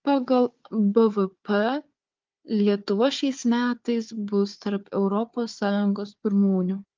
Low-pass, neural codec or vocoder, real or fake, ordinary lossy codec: 7.2 kHz; codec, 16 kHz, 4 kbps, FunCodec, trained on Chinese and English, 50 frames a second; fake; Opus, 24 kbps